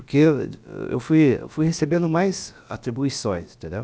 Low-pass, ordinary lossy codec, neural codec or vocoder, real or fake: none; none; codec, 16 kHz, about 1 kbps, DyCAST, with the encoder's durations; fake